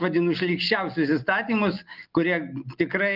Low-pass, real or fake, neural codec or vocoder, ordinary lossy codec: 5.4 kHz; real; none; Opus, 32 kbps